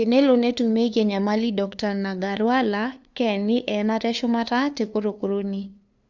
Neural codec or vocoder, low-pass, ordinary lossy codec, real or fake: codec, 16 kHz, 2 kbps, FunCodec, trained on LibriTTS, 25 frames a second; 7.2 kHz; Opus, 64 kbps; fake